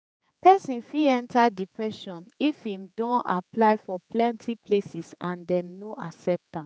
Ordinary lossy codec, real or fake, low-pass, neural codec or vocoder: none; fake; none; codec, 16 kHz, 4 kbps, X-Codec, HuBERT features, trained on general audio